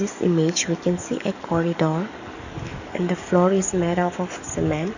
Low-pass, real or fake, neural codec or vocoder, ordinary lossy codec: 7.2 kHz; fake; codec, 44.1 kHz, 7.8 kbps, DAC; none